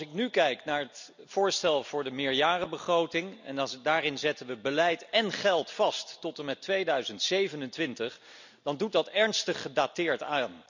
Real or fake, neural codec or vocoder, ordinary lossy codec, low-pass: real; none; none; 7.2 kHz